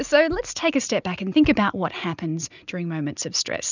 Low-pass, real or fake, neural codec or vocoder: 7.2 kHz; real; none